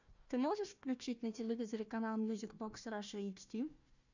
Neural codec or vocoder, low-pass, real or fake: codec, 16 kHz, 1 kbps, FunCodec, trained on Chinese and English, 50 frames a second; 7.2 kHz; fake